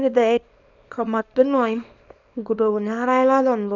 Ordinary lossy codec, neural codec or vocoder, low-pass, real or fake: none; codec, 24 kHz, 0.9 kbps, WavTokenizer, small release; 7.2 kHz; fake